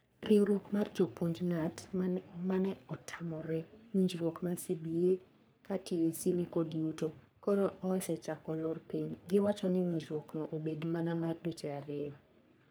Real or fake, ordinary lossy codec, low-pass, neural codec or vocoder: fake; none; none; codec, 44.1 kHz, 3.4 kbps, Pupu-Codec